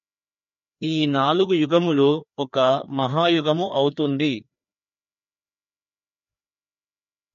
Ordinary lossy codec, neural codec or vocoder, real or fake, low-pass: MP3, 48 kbps; codec, 16 kHz, 2 kbps, FreqCodec, larger model; fake; 7.2 kHz